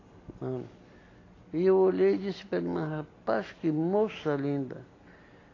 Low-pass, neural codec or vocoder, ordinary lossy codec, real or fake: 7.2 kHz; none; AAC, 32 kbps; real